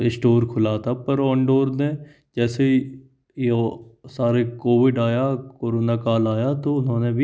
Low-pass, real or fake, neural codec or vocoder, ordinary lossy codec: none; real; none; none